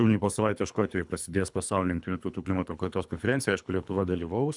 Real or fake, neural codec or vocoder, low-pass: fake; codec, 24 kHz, 3 kbps, HILCodec; 10.8 kHz